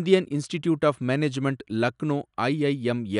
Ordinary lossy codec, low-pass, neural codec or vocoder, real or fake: none; 10.8 kHz; none; real